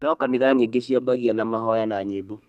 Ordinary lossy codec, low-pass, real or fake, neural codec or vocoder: none; 14.4 kHz; fake; codec, 32 kHz, 1.9 kbps, SNAC